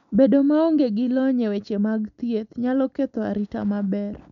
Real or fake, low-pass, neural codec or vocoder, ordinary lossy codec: real; 7.2 kHz; none; none